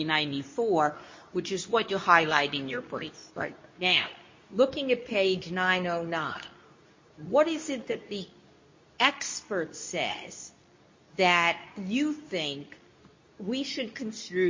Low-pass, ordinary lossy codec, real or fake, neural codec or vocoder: 7.2 kHz; MP3, 32 kbps; fake; codec, 24 kHz, 0.9 kbps, WavTokenizer, medium speech release version 2